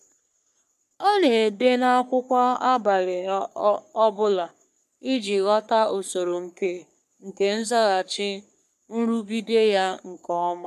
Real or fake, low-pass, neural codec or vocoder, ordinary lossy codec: fake; 14.4 kHz; codec, 44.1 kHz, 3.4 kbps, Pupu-Codec; none